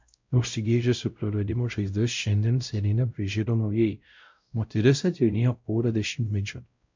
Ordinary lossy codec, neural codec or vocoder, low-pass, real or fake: MP3, 64 kbps; codec, 16 kHz, 0.5 kbps, X-Codec, WavLM features, trained on Multilingual LibriSpeech; 7.2 kHz; fake